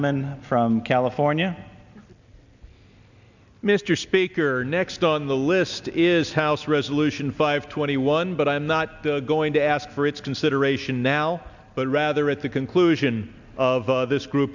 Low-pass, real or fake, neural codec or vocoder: 7.2 kHz; real; none